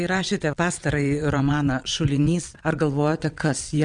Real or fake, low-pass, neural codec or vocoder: fake; 9.9 kHz; vocoder, 22.05 kHz, 80 mel bands, WaveNeXt